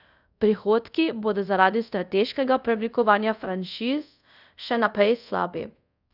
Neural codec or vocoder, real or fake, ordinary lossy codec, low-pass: codec, 24 kHz, 0.5 kbps, DualCodec; fake; none; 5.4 kHz